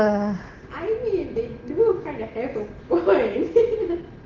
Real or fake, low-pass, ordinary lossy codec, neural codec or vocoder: real; 7.2 kHz; Opus, 16 kbps; none